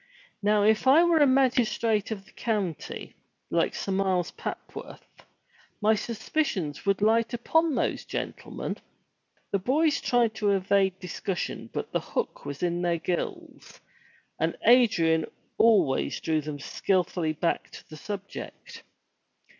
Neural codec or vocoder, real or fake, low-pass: none; real; 7.2 kHz